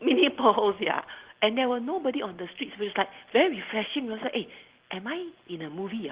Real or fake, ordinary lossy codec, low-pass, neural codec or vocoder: real; Opus, 16 kbps; 3.6 kHz; none